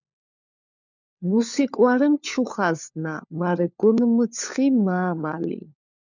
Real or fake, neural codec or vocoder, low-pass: fake; codec, 16 kHz, 4 kbps, FunCodec, trained on LibriTTS, 50 frames a second; 7.2 kHz